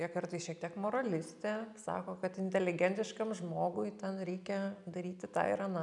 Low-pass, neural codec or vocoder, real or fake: 10.8 kHz; none; real